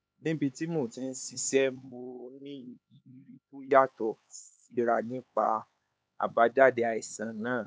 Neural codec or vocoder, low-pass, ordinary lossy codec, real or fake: codec, 16 kHz, 4 kbps, X-Codec, HuBERT features, trained on LibriSpeech; none; none; fake